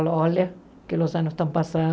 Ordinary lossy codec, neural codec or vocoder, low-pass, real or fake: none; none; none; real